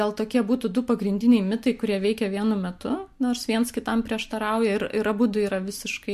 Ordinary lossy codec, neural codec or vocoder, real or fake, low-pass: MP3, 64 kbps; none; real; 14.4 kHz